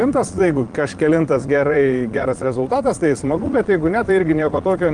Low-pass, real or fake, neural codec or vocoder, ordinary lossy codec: 9.9 kHz; fake; vocoder, 22.05 kHz, 80 mel bands, Vocos; Opus, 24 kbps